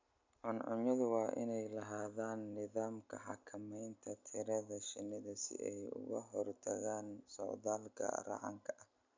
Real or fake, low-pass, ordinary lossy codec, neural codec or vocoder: real; 7.2 kHz; MP3, 64 kbps; none